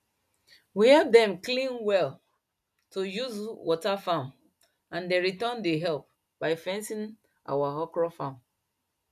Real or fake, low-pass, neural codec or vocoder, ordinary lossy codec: real; 14.4 kHz; none; none